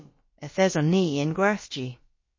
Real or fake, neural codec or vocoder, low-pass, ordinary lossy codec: fake; codec, 16 kHz, about 1 kbps, DyCAST, with the encoder's durations; 7.2 kHz; MP3, 32 kbps